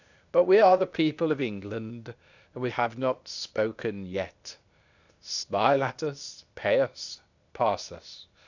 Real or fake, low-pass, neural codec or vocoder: fake; 7.2 kHz; codec, 16 kHz, 0.8 kbps, ZipCodec